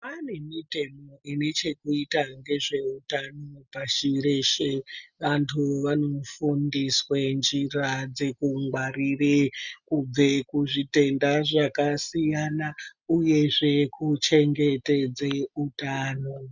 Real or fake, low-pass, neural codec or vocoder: real; 7.2 kHz; none